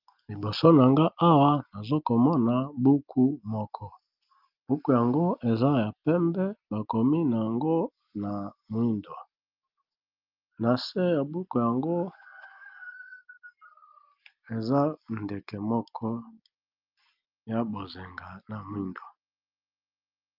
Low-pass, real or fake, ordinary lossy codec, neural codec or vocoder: 5.4 kHz; real; Opus, 24 kbps; none